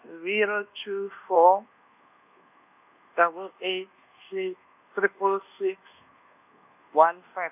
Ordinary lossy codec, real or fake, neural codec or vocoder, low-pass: none; fake; codec, 24 kHz, 1.2 kbps, DualCodec; 3.6 kHz